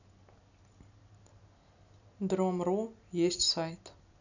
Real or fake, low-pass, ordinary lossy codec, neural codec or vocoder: real; 7.2 kHz; none; none